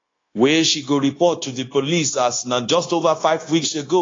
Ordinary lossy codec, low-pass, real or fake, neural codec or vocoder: AAC, 32 kbps; 7.2 kHz; fake; codec, 16 kHz, 0.9 kbps, LongCat-Audio-Codec